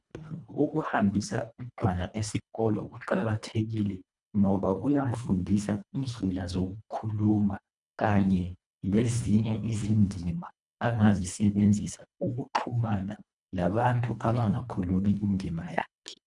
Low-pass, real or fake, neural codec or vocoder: 10.8 kHz; fake; codec, 24 kHz, 1.5 kbps, HILCodec